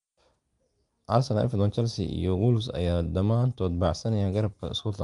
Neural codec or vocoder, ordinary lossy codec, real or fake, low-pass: none; Opus, 32 kbps; real; 10.8 kHz